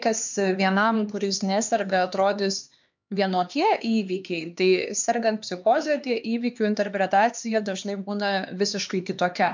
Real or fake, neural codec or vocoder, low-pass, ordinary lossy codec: fake; codec, 16 kHz, 2 kbps, X-Codec, HuBERT features, trained on LibriSpeech; 7.2 kHz; MP3, 64 kbps